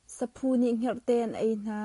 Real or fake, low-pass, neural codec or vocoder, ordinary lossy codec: real; 10.8 kHz; none; AAC, 48 kbps